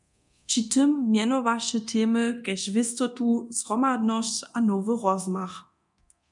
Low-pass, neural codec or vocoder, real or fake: 10.8 kHz; codec, 24 kHz, 0.9 kbps, DualCodec; fake